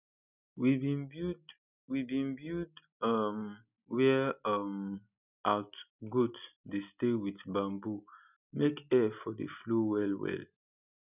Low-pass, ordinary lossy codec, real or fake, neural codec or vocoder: 3.6 kHz; none; real; none